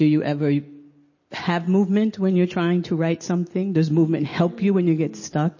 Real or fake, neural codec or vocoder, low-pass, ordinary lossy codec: real; none; 7.2 kHz; MP3, 32 kbps